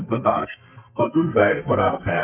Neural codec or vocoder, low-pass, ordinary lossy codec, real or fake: codec, 32 kHz, 1.9 kbps, SNAC; 3.6 kHz; none; fake